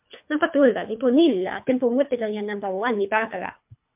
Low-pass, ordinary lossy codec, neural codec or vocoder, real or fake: 3.6 kHz; MP3, 32 kbps; codec, 24 kHz, 3 kbps, HILCodec; fake